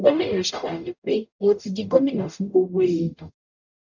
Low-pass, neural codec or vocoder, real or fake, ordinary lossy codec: 7.2 kHz; codec, 44.1 kHz, 0.9 kbps, DAC; fake; none